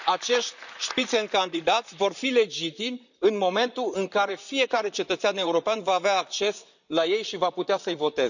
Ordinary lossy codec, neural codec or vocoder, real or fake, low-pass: none; vocoder, 44.1 kHz, 128 mel bands, Pupu-Vocoder; fake; 7.2 kHz